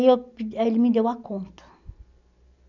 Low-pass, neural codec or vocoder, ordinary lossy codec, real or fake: 7.2 kHz; none; none; real